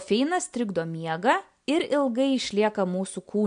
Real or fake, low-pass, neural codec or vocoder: real; 9.9 kHz; none